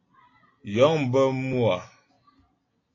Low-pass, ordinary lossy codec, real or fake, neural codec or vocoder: 7.2 kHz; AAC, 32 kbps; real; none